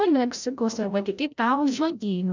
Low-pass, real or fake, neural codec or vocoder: 7.2 kHz; fake; codec, 16 kHz, 0.5 kbps, FreqCodec, larger model